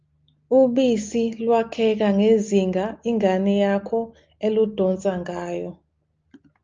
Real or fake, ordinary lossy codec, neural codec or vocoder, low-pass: real; Opus, 24 kbps; none; 7.2 kHz